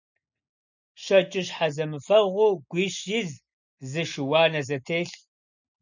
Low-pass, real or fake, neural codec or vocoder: 7.2 kHz; real; none